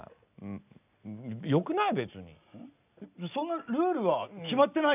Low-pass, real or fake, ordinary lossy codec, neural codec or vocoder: 3.6 kHz; real; none; none